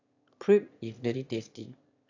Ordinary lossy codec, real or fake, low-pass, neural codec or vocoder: AAC, 48 kbps; fake; 7.2 kHz; autoencoder, 22.05 kHz, a latent of 192 numbers a frame, VITS, trained on one speaker